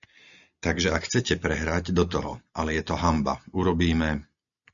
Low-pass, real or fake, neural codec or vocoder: 7.2 kHz; real; none